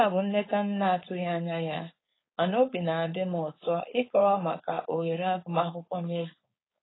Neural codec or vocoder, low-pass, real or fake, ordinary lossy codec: codec, 16 kHz, 4.8 kbps, FACodec; 7.2 kHz; fake; AAC, 16 kbps